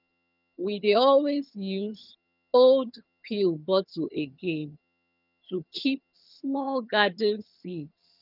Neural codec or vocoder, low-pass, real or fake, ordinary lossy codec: vocoder, 22.05 kHz, 80 mel bands, HiFi-GAN; 5.4 kHz; fake; none